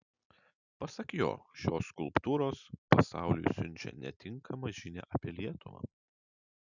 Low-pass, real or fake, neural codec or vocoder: 7.2 kHz; real; none